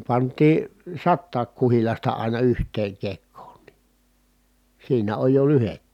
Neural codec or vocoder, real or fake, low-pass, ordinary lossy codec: none; real; 19.8 kHz; none